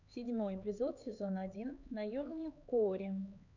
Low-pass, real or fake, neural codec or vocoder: 7.2 kHz; fake; codec, 16 kHz, 4 kbps, X-Codec, HuBERT features, trained on LibriSpeech